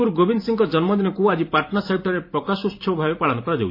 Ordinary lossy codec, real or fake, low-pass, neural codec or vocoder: MP3, 24 kbps; fake; 5.4 kHz; vocoder, 44.1 kHz, 128 mel bands every 512 samples, BigVGAN v2